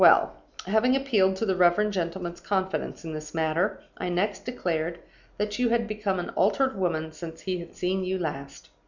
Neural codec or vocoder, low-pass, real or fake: none; 7.2 kHz; real